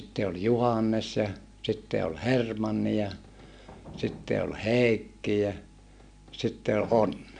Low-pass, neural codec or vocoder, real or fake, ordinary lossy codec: 9.9 kHz; none; real; MP3, 96 kbps